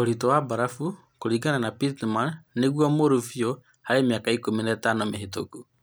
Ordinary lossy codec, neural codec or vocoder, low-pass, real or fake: none; none; none; real